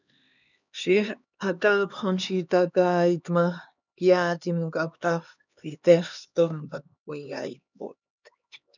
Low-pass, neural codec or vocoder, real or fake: 7.2 kHz; codec, 16 kHz, 2 kbps, X-Codec, HuBERT features, trained on LibriSpeech; fake